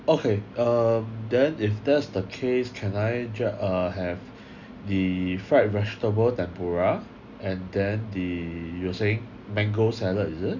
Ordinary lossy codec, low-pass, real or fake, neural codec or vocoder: none; 7.2 kHz; real; none